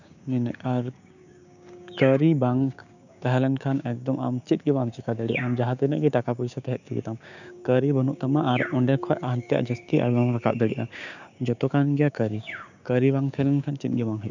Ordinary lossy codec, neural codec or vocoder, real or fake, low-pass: none; codec, 16 kHz, 6 kbps, DAC; fake; 7.2 kHz